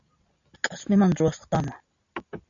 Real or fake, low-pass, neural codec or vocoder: real; 7.2 kHz; none